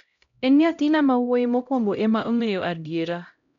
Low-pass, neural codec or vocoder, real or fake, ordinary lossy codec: 7.2 kHz; codec, 16 kHz, 0.5 kbps, X-Codec, HuBERT features, trained on LibriSpeech; fake; none